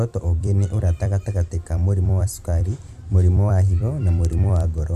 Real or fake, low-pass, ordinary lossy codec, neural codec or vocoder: fake; 14.4 kHz; Opus, 64 kbps; vocoder, 44.1 kHz, 128 mel bands every 256 samples, BigVGAN v2